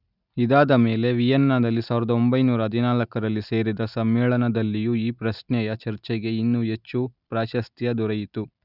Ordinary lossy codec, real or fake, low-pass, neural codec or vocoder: none; real; 5.4 kHz; none